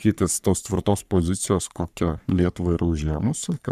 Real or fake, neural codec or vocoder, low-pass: fake; codec, 44.1 kHz, 3.4 kbps, Pupu-Codec; 14.4 kHz